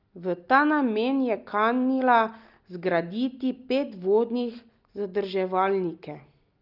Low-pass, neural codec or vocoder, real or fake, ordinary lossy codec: 5.4 kHz; none; real; Opus, 24 kbps